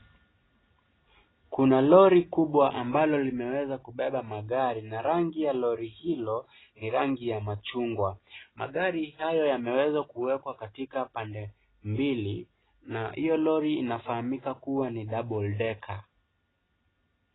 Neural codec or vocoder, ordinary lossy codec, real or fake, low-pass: none; AAC, 16 kbps; real; 7.2 kHz